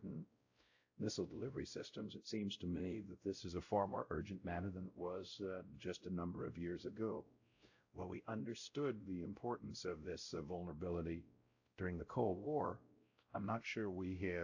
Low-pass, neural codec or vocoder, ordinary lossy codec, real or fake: 7.2 kHz; codec, 16 kHz, 0.5 kbps, X-Codec, WavLM features, trained on Multilingual LibriSpeech; AAC, 48 kbps; fake